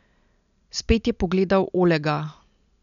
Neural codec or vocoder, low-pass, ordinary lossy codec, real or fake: none; 7.2 kHz; none; real